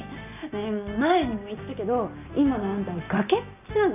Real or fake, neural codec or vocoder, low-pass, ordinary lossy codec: real; none; 3.6 kHz; none